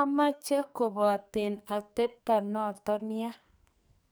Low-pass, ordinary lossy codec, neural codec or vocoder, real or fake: none; none; codec, 44.1 kHz, 2.6 kbps, SNAC; fake